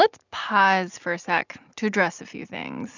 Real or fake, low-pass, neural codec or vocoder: real; 7.2 kHz; none